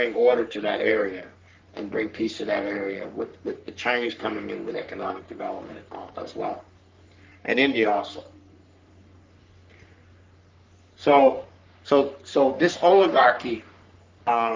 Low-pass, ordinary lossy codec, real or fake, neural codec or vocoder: 7.2 kHz; Opus, 32 kbps; fake; codec, 44.1 kHz, 3.4 kbps, Pupu-Codec